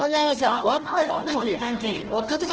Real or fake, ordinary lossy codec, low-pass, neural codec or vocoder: fake; Opus, 16 kbps; 7.2 kHz; codec, 16 kHz, 1 kbps, FunCodec, trained on Chinese and English, 50 frames a second